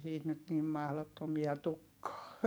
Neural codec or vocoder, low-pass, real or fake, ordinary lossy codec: codec, 44.1 kHz, 7.8 kbps, DAC; none; fake; none